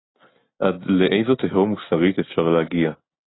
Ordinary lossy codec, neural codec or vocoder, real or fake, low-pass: AAC, 16 kbps; none; real; 7.2 kHz